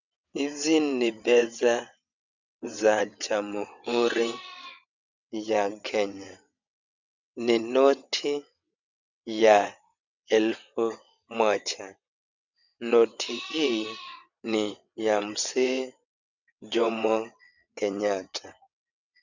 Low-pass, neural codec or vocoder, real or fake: 7.2 kHz; vocoder, 22.05 kHz, 80 mel bands, WaveNeXt; fake